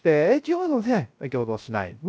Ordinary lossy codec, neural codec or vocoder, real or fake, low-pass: none; codec, 16 kHz, 0.3 kbps, FocalCodec; fake; none